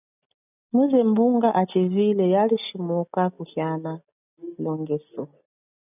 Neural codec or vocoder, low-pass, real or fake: none; 3.6 kHz; real